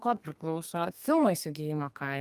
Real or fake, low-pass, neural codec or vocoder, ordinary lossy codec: fake; 14.4 kHz; codec, 32 kHz, 1.9 kbps, SNAC; Opus, 32 kbps